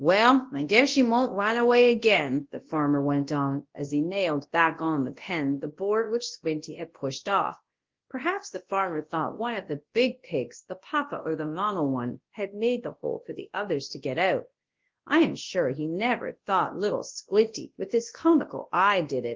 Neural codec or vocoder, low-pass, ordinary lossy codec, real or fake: codec, 24 kHz, 0.9 kbps, WavTokenizer, large speech release; 7.2 kHz; Opus, 16 kbps; fake